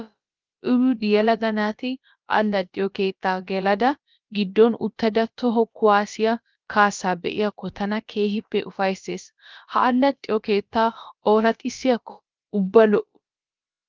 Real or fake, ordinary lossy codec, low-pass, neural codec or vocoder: fake; Opus, 32 kbps; 7.2 kHz; codec, 16 kHz, about 1 kbps, DyCAST, with the encoder's durations